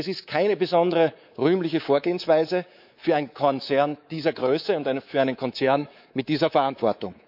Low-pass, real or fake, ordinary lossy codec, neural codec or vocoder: 5.4 kHz; fake; none; codec, 24 kHz, 3.1 kbps, DualCodec